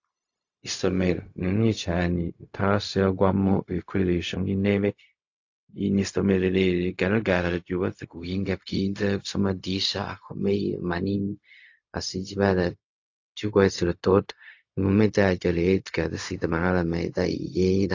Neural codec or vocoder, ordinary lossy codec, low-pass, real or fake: codec, 16 kHz, 0.4 kbps, LongCat-Audio-Codec; AAC, 48 kbps; 7.2 kHz; fake